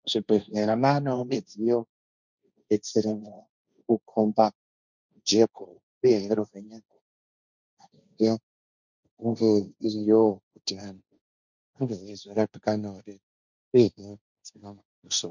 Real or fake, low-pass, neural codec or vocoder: fake; 7.2 kHz; codec, 16 kHz, 1.1 kbps, Voila-Tokenizer